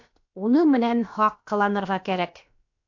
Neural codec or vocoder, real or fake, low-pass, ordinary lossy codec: codec, 16 kHz, about 1 kbps, DyCAST, with the encoder's durations; fake; 7.2 kHz; AAC, 48 kbps